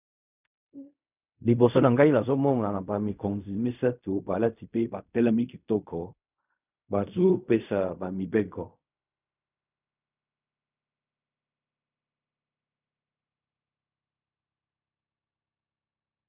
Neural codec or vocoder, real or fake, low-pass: codec, 16 kHz in and 24 kHz out, 0.4 kbps, LongCat-Audio-Codec, fine tuned four codebook decoder; fake; 3.6 kHz